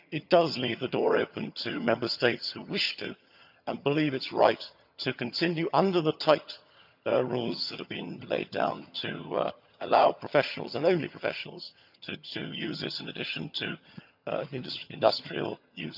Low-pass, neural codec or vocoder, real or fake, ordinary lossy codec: 5.4 kHz; vocoder, 22.05 kHz, 80 mel bands, HiFi-GAN; fake; none